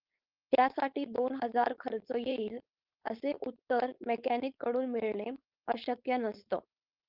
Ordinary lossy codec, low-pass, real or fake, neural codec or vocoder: Opus, 24 kbps; 5.4 kHz; fake; codec, 16 kHz, 4.8 kbps, FACodec